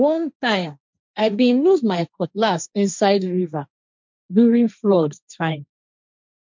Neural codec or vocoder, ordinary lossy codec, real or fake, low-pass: codec, 16 kHz, 1.1 kbps, Voila-Tokenizer; MP3, 64 kbps; fake; 7.2 kHz